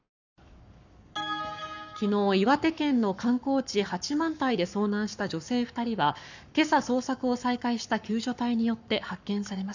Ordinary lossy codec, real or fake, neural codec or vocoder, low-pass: none; fake; codec, 44.1 kHz, 7.8 kbps, Pupu-Codec; 7.2 kHz